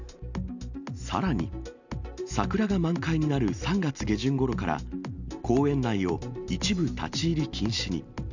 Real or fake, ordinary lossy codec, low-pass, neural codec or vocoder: real; none; 7.2 kHz; none